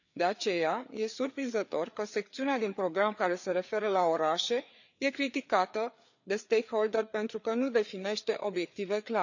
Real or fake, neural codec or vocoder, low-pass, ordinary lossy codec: fake; codec, 16 kHz, 4 kbps, FreqCodec, larger model; 7.2 kHz; none